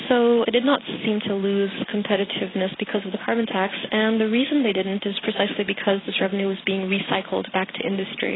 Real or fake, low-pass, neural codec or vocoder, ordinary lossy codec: real; 7.2 kHz; none; AAC, 16 kbps